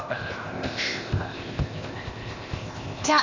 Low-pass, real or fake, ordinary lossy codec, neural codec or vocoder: 7.2 kHz; fake; none; codec, 16 kHz, 0.8 kbps, ZipCodec